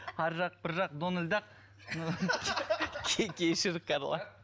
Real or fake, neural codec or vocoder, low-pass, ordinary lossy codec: real; none; none; none